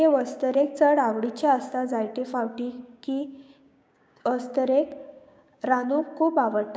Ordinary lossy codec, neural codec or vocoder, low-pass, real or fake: none; codec, 16 kHz, 6 kbps, DAC; none; fake